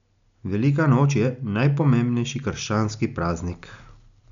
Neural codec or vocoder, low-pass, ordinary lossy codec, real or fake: none; 7.2 kHz; none; real